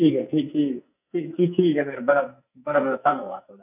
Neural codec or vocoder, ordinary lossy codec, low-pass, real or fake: codec, 44.1 kHz, 2.6 kbps, SNAC; none; 3.6 kHz; fake